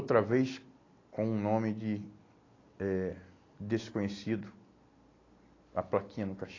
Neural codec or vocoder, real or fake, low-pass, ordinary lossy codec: none; real; 7.2 kHz; AAC, 32 kbps